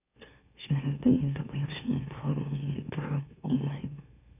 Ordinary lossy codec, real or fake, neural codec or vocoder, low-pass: none; fake; autoencoder, 44.1 kHz, a latent of 192 numbers a frame, MeloTTS; 3.6 kHz